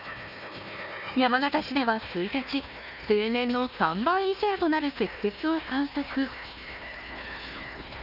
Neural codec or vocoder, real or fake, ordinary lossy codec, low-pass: codec, 16 kHz, 1 kbps, FunCodec, trained on Chinese and English, 50 frames a second; fake; none; 5.4 kHz